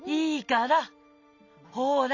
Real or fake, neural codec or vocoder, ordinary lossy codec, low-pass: real; none; MP3, 64 kbps; 7.2 kHz